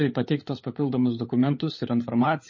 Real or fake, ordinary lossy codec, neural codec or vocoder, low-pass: fake; MP3, 32 kbps; codec, 16 kHz, 16 kbps, FunCodec, trained on LibriTTS, 50 frames a second; 7.2 kHz